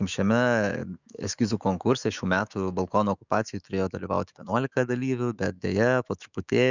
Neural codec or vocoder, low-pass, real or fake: autoencoder, 48 kHz, 128 numbers a frame, DAC-VAE, trained on Japanese speech; 7.2 kHz; fake